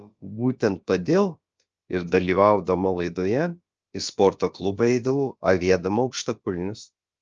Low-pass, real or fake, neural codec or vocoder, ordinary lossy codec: 7.2 kHz; fake; codec, 16 kHz, about 1 kbps, DyCAST, with the encoder's durations; Opus, 24 kbps